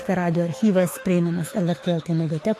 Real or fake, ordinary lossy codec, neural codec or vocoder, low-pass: fake; AAC, 48 kbps; autoencoder, 48 kHz, 32 numbers a frame, DAC-VAE, trained on Japanese speech; 14.4 kHz